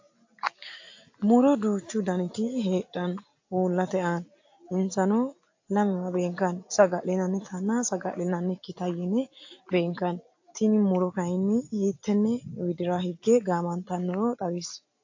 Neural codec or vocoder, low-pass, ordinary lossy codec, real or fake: none; 7.2 kHz; AAC, 48 kbps; real